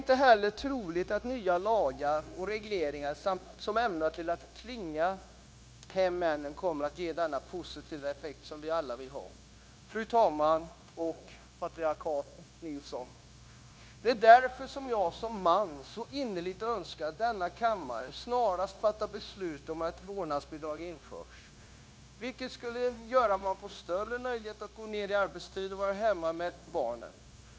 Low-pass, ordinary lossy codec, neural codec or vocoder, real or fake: none; none; codec, 16 kHz, 0.9 kbps, LongCat-Audio-Codec; fake